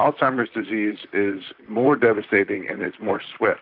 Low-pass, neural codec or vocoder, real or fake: 5.4 kHz; vocoder, 44.1 kHz, 128 mel bands, Pupu-Vocoder; fake